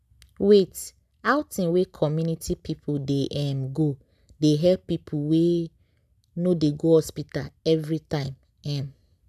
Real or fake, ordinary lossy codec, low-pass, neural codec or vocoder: real; none; 14.4 kHz; none